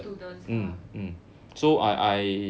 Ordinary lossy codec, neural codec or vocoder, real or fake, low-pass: none; none; real; none